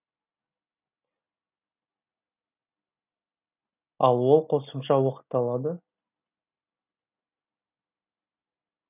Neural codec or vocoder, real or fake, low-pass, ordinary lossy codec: none; real; 3.6 kHz; none